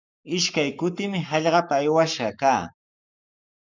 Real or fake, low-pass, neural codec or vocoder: fake; 7.2 kHz; codec, 44.1 kHz, 7.8 kbps, Pupu-Codec